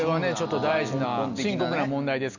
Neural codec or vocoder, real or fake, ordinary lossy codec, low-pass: none; real; none; 7.2 kHz